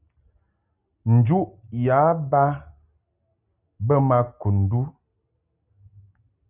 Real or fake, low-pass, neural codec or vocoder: real; 3.6 kHz; none